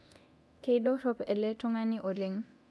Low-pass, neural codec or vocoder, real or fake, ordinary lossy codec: none; codec, 24 kHz, 0.9 kbps, DualCodec; fake; none